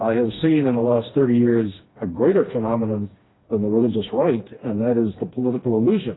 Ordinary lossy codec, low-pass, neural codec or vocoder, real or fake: AAC, 16 kbps; 7.2 kHz; codec, 16 kHz, 2 kbps, FreqCodec, smaller model; fake